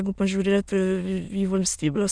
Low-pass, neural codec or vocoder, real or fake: 9.9 kHz; autoencoder, 22.05 kHz, a latent of 192 numbers a frame, VITS, trained on many speakers; fake